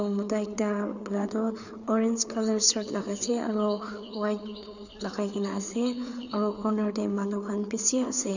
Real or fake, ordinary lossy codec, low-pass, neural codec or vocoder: fake; none; 7.2 kHz; codec, 16 kHz, 4 kbps, FreqCodec, larger model